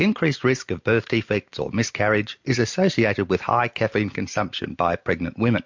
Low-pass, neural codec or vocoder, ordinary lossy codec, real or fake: 7.2 kHz; none; MP3, 48 kbps; real